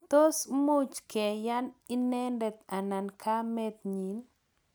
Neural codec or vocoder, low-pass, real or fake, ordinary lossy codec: none; none; real; none